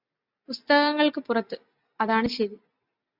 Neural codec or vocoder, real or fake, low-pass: none; real; 5.4 kHz